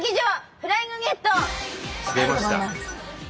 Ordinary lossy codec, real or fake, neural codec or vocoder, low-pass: none; real; none; none